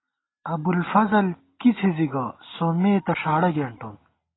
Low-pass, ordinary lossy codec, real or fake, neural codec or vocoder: 7.2 kHz; AAC, 16 kbps; real; none